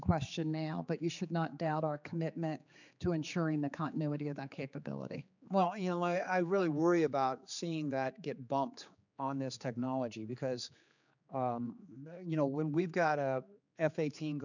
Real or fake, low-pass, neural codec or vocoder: fake; 7.2 kHz; codec, 16 kHz, 4 kbps, X-Codec, HuBERT features, trained on general audio